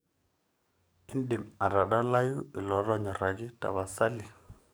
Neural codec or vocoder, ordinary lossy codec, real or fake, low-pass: codec, 44.1 kHz, 7.8 kbps, DAC; none; fake; none